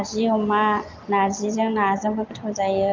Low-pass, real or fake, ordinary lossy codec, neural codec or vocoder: 7.2 kHz; real; Opus, 32 kbps; none